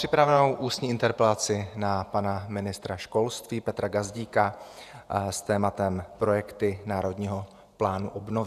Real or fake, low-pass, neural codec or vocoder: fake; 14.4 kHz; vocoder, 48 kHz, 128 mel bands, Vocos